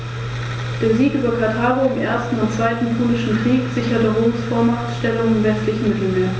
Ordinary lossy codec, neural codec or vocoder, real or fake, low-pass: none; none; real; none